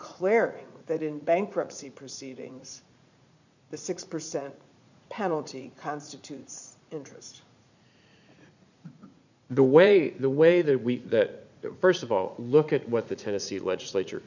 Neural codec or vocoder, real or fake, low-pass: vocoder, 44.1 kHz, 80 mel bands, Vocos; fake; 7.2 kHz